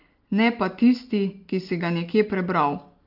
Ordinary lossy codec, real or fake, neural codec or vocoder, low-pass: Opus, 32 kbps; real; none; 5.4 kHz